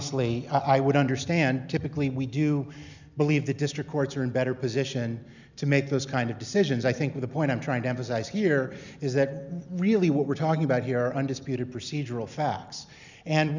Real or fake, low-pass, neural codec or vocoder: real; 7.2 kHz; none